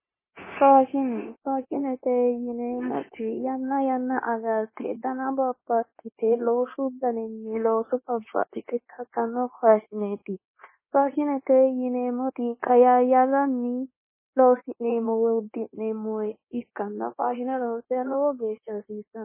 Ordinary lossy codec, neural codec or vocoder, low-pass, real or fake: MP3, 16 kbps; codec, 16 kHz, 0.9 kbps, LongCat-Audio-Codec; 3.6 kHz; fake